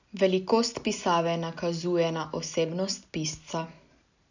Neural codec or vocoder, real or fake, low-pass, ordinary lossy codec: none; real; 7.2 kHz; none